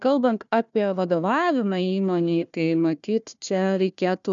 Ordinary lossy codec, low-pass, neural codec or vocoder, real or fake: MP3, 96 kbps; 7.2 kHz; codec, 16 kHz, 1 kbps, FunCodec, trained on Chinese and English, 50 frames a second; fake